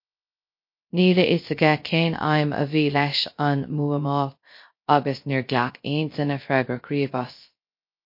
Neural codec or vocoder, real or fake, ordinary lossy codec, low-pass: codec, 16 kHz, 0.3 kbps, FocalCodec; fake; MP3, 32 kbps; 5.4 kHz